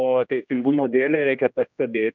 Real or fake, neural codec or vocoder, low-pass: fake; codec, 16 kHz, 1 kbps, X-Codec, HuBERT features, trained on general audio; 7.2 kHz